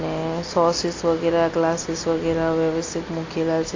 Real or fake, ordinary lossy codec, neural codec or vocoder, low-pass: real; AAC, 32 kbps; none; 7.2 kHz